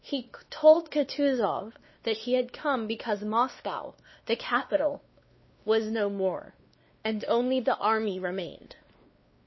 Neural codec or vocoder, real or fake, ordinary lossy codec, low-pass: codec, 16 kHz, 2 kbps, X-Codec, WavLM features, trained on Multilingual LibriSpeech; fake; MP3, 24 kbps; 7.2 kHz